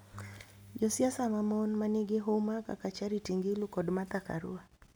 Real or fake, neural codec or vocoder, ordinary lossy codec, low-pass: real; none; none; none